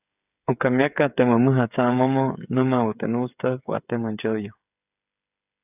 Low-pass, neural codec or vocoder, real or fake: 3.6 kHz; codec, 16 kHz, 8 kbps, FreqCodec, smaller model; fake